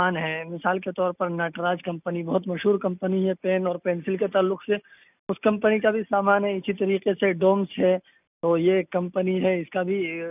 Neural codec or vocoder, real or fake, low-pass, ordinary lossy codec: none; real; 3.6 kHz; none